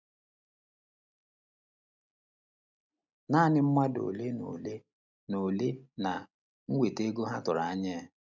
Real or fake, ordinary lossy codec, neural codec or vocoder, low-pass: real; none; none; 7.2 kHz